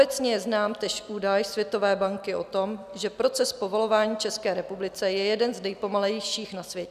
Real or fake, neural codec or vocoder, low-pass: real; none; 14.4 kHz